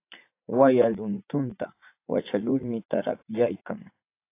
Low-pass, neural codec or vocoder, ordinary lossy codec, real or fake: 3.6 kHz; vocoder, 44.1 kHz, 80 mel bands, Vocos; AAC, 24 kbps; fake